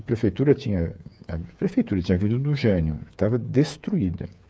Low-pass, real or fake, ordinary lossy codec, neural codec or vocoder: none; fake; none; codec, 16 kHz, 8 kbps, FreqCodec, smaller model